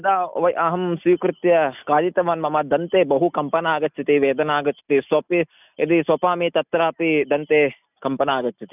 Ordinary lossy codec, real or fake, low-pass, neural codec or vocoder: none; real; 3.6 kHz; none